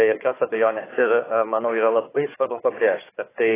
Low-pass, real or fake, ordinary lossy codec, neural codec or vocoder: 3.6 kHz; fake; AAC, 16 kbps; codec, 16 kHz, 4 kbps, FunCodec, trained on Chinese and English, 50 frames a second